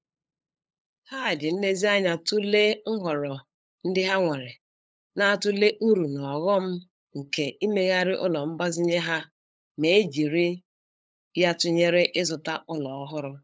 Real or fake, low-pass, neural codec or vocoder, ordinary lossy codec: fake; none; codec, 16 kHz, 8 kbps, FunCodec, trained on LibriTTS, 25 frames a second; none